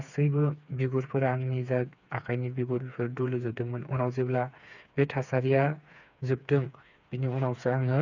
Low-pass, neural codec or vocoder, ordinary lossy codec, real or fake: 7.2 kHz; codec, 16 kHz, 4 kbps, FreqCodec, smaller model; none; fake